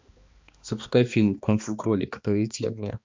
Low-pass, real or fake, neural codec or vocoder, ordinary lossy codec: 7.2 kHz; fake; codec, 16 kHz, 2 kbps, X-Codec, HuBERT features, trained on balanced general audio; MP3, 64 kbps